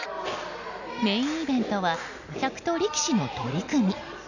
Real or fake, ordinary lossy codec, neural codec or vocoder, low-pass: real; none; none; 7.2 kHz